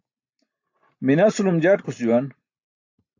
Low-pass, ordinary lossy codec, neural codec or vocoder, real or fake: 7.2 kHz; AAC, 48 kbps; none; real